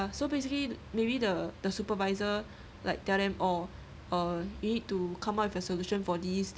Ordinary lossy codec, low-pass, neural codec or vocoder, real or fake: none; none; none; real